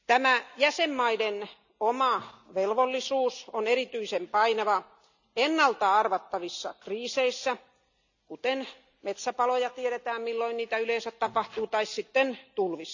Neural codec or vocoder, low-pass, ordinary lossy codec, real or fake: none; 7.2 kHz; none; real